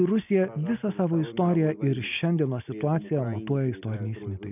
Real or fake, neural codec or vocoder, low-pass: real; none; 3.6 kHz